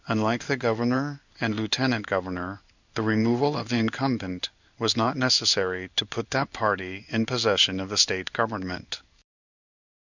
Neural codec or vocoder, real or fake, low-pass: codec, 16 kHz in and 24 kHz out, 1 kbps, XY-Tokenizer; fake; 7.2 kHz